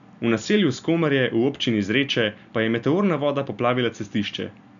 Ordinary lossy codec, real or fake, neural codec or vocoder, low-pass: none; real; none; 7.2 kHz